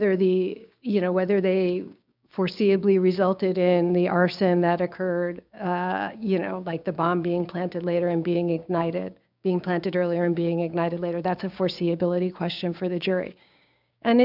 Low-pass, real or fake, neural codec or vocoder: 5.4 kHz; fake; codec, 16 kHz, 6 kbps, DAC